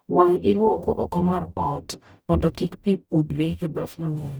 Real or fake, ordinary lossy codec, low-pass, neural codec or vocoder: fake; none; none; codec, 44.1 kHz, 0.9 kbps, DAC